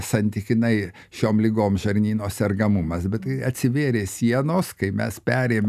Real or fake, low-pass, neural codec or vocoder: real; 14.4 kHz; none